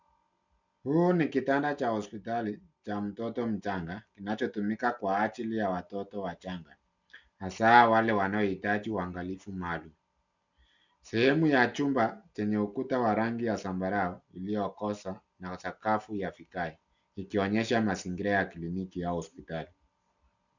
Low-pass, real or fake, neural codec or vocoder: 7.2 kHz; real; none